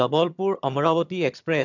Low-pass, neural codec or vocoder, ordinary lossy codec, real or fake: 7.2 kHz; vocoder, 22.05 kHz, 80 mel bands, HiFi-GAN; none; fake